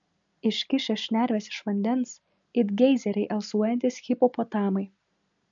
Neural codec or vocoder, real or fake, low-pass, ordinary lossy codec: none; real; 7.2 kHz; MP3, 64 kbps